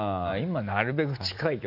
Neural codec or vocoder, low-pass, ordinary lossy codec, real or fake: none; 5.4 kHz; none; real